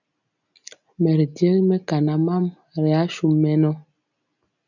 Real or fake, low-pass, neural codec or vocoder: real; 7.2 kHz; none